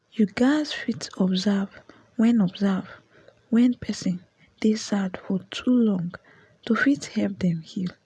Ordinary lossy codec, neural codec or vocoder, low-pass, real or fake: none; none; none; real